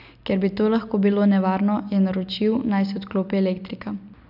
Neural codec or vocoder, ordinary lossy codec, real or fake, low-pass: none; none; real; 5.4 kHz